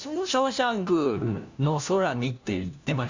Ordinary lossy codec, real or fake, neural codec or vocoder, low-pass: Opus, 64 kbps; fake; codec, 16 kHz, 1 kbps, FunCodec, trained on LibriTTS, 50 frames a second; 7.2 kHz